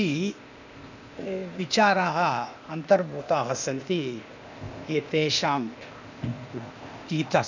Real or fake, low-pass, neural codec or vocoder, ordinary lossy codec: fake; 7.2 kHz; codec, 16 kHz, 0.8 kbps, ZipCodec; none